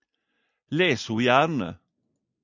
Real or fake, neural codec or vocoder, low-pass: real; none; 7.2 kHz